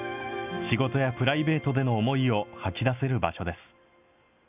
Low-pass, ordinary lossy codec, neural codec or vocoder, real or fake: 3.6 kHz; none; none; real